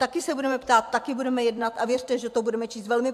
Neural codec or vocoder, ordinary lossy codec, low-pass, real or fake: vocoder, 44.1 kHz, 128 mel bands every 512 samples, BigVGAN v2; AAC, 96 kbps; 14.4 kHz; fake